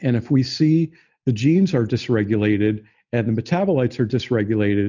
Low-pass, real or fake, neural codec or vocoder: 7.2 kHz; real; none